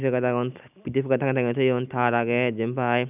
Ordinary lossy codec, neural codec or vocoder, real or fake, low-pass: none; none; real; 3.6 kHz